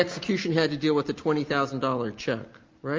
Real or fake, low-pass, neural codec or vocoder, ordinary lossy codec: real; 7.2 kHz; none; Opus, 32 kbps